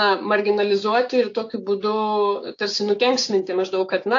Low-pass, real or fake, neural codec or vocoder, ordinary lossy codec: 7.2 kHz; fake; codec, 16 kHz, 6 kbps, DAC; AAC, 48 kbps